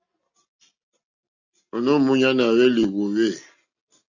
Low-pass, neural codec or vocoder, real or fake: 7.2 kHz; none; real